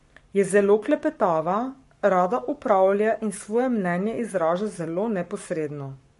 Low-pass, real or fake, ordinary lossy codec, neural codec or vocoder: 14.4 kHz; fake; MP3, 48 kbps; codec, 44.1 kHz, 7.8 kbps, Pupu-Codec